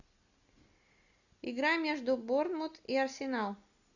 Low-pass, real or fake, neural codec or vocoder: 7.2 kHz; real; none